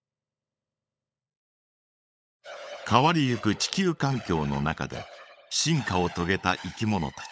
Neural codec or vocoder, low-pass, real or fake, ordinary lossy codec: codec, 16 kHz, 16 kbps, FunCodec, trained on LibriTTS, 50 frames a second; none; fake; none